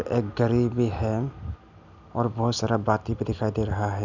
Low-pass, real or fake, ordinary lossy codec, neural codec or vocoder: 7.2 kHz; real; none; none